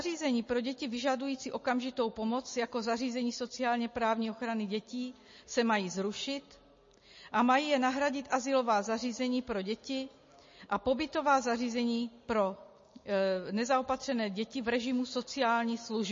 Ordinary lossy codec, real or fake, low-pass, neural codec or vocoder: MP3, 32 kbps; real; 7.2 kHz; none